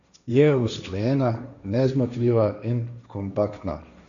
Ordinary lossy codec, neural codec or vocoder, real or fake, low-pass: none; codec, 16 kHz, 1.1 kbps, Voila-Tokenizer; fake; 7.2 kHz